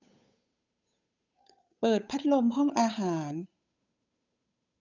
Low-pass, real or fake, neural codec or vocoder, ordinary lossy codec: 7.2 kHz; fake; codec, 16 kHz, 8 kbps, FunCodec, trained on Chinese and English, 25 frames a second; none